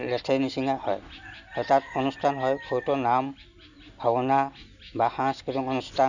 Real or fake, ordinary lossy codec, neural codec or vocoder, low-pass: real; none; none; 7.2 kHz